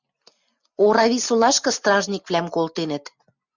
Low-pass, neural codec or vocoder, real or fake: 7.2 kHz; none; real